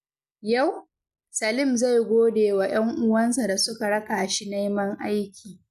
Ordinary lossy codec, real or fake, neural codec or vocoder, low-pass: none; real; none; 14.4 kHz